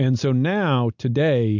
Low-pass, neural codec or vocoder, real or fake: 7.2 kHz; none; real